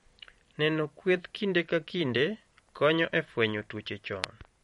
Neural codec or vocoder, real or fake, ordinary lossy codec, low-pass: vocoder, 44.1 kHz, 128 mel bands every 512 samples, BigVGAN v2; fake; MP3, 48 kbps; 19.8 kHz